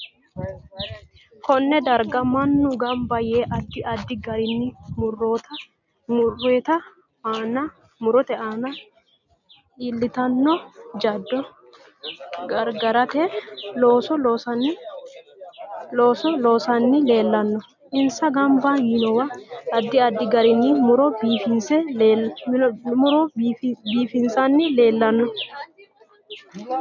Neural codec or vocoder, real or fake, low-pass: none; real; 7.2 kHz